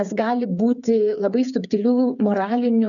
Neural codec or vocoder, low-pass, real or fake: codec, 16 kHz, 8 kbps, FreqCodec, smaller model; 7.2 kHz; fake